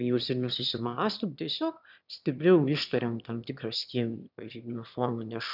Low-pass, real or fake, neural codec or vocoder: 5.4 kHz; fake; autoencoder, 22.05 kHz, a latent of 192 numbers a frame, VITS, trained on one speaker